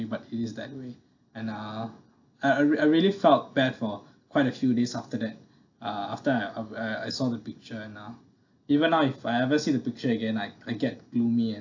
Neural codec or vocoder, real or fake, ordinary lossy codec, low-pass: none; real; AAC, 48 kbps; 7.2 kHz